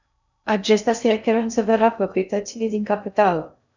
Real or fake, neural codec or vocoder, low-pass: fake; codec, 16 kHz in and 24 kHz out, 0.6 kbps, FocalCodec, streaming, 2048 codes; 7.2 kHz